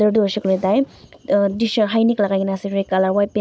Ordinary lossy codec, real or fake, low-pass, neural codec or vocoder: none; real; none; none